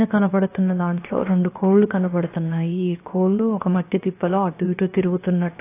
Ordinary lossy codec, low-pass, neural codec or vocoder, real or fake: AAC, 24 kbps; 3.6 kHz; codec, 16 kHz, 0.7 kbps, FocalCodec; fake